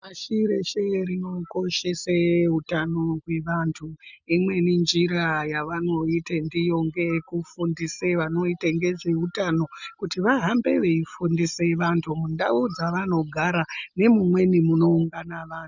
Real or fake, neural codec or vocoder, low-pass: real; none; 7.2 kHz